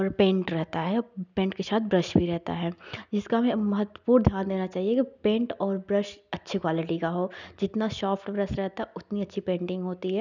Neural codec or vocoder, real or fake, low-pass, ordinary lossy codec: none; real; 7.2 kHz; none